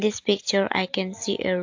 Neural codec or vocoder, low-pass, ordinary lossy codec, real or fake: none; 7.2 kHz; AAC, 48 kbps; real